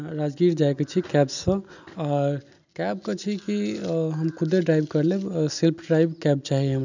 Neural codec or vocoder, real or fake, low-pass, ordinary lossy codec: none; real; 7.2 kHz; none